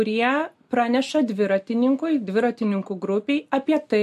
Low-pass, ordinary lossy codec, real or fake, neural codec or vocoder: 14.4 kHz; MP3, 64 kbps; real; none